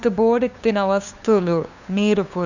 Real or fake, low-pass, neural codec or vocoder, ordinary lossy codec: fake; 7.2 kHz; codec, 16 kHz, 2 kbps, FunCodec, trained on LibriTTS, 25 frames a second; none